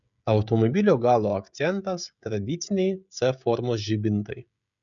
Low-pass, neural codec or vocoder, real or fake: 7.2 kHz; codec, 16 kHz, 16 kbps, FreqCodec, smaller model; fake